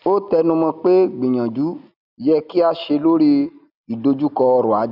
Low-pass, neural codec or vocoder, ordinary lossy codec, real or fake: 5.4 kHz; none; none; real